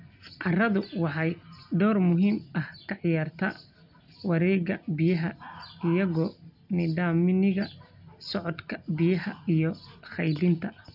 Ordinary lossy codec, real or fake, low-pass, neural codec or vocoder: none; real; 5.4 kHz; none